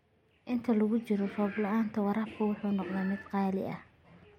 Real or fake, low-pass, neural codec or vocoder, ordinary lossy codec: real; 19.8 kHz; none; MP3, 64 kbps